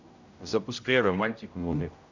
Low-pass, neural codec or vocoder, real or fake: 7.2 kHz; codec, 16 kHz, 0.5 kbps, X-Codec, HuBERT features, trained on general audio; fake